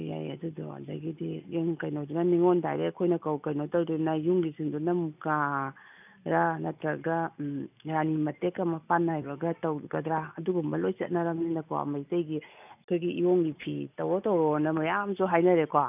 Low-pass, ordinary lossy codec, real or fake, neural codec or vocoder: 3.6 kHz; none; real; none